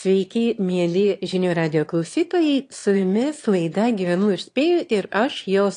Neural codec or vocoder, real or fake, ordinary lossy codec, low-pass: autoencoder, 22.05 kHz, a latent of 192 numbers a frame, VITS, trained on one speaker; fake; AAC, 48 kbps; 9.9 kHz